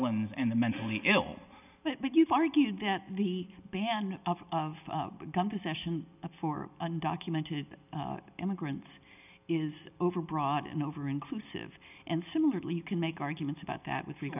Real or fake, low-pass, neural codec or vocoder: real; 3.6 kHz; none